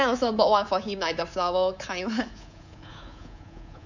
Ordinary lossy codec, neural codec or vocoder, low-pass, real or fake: none; codec, 24 kHz, 3.1 kbps, DualCodec; 7.2 kHz; fake